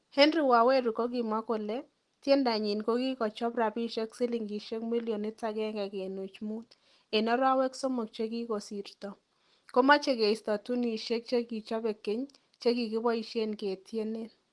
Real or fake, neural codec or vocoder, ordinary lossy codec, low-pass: real; none; Opus, 24 kbps; 10.8 kHz